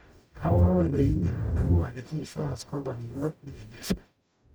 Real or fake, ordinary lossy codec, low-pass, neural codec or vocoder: fake; none; none; codec, 44.1 kHz, 0.9 kbps, DAC